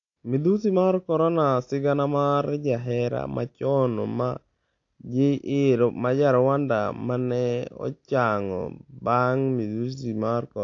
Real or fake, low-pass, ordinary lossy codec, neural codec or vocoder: real; 7.2 kHz; AAC, 48 kbps; none